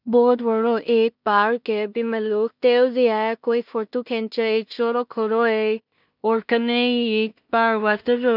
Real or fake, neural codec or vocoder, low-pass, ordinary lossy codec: fake; codec, 16 kHz in and 24 kHz out, 0.4 kbps, LongCat-Audio-Codec, two codebook decoder; 5.4 kHz; none